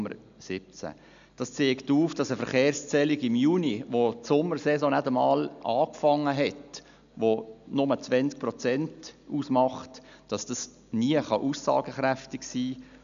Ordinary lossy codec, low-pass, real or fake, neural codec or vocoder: none; 7.2 kHz; real; none